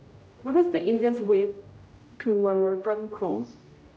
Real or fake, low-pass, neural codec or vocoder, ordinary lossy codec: fake; none; codec, 16 kHz, 0.5 kbps, X-Codec, HuBERT features, trained on general audio; none